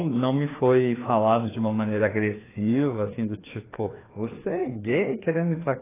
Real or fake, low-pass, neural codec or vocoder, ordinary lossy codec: fake; 3.6 kHz; codec, 16 kHz, 2 kbps, FreqCodec, larger model; AAC, 16 kbps